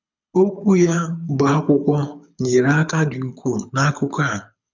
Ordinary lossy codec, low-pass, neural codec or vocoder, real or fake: none; 7.2 kHz; codec, 24 kHz, 6 kbps, HILCodec; fake